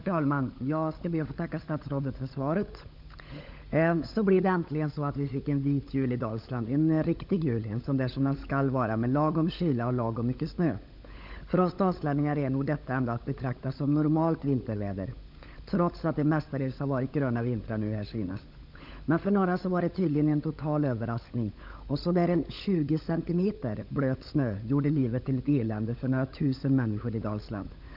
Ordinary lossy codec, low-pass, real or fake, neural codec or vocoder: none; 5.4 kHz; fake; codec, 16 kHz, 16 kbps, FunCodec, trained on LibriTTS, 50 frames a second